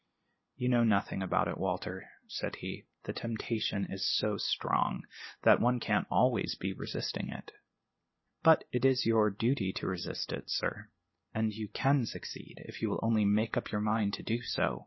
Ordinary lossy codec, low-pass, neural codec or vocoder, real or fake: MP3, 24 kbps; 7.2 kHz; none; real